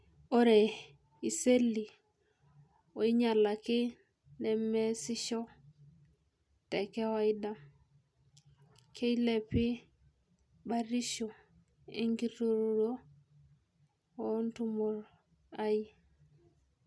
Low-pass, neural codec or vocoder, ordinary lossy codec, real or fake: none; none; none; real